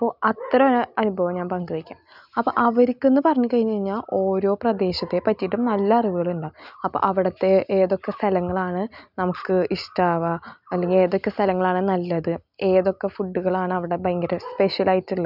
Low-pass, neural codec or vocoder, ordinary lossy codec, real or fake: 5.4 kHz; none; none; real